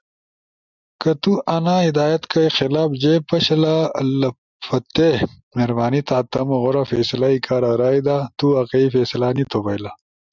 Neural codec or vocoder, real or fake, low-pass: none; real; 7.2 kHz